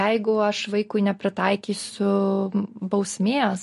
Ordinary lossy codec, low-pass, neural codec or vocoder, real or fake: MP3, 48 kbps; 14.4 kHz; none; real